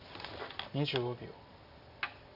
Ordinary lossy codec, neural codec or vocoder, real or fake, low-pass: none; none; real; 5.4 kHz